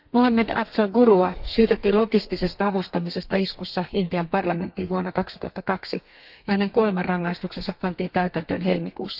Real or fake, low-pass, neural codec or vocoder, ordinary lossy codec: fake; 5.4 kHz; codec, 32 kHz, 1.9 kbps, SNAC; none